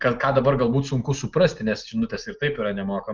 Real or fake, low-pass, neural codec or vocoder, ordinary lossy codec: real; 7.2 kHz; none; Opus, 32 kbps